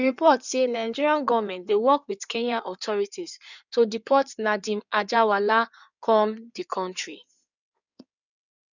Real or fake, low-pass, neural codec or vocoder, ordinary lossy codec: fake; 7.2 kHz; codec, 16 kHz in and 24 kHz out, 2.2 kbps, FireRedTTS-2 codec; none